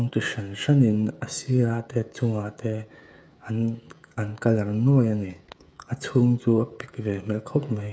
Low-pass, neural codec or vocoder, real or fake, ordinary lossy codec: none; codec, 16 kHz, 16 kbps, FreqCodec, smaller model; fake; none